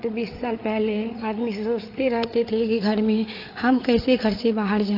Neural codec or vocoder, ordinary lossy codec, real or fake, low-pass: codec, 16 kHz, 8 kbps, FreqCodec, larger model; AAC, 32 kbps; fake; 5.4 kHz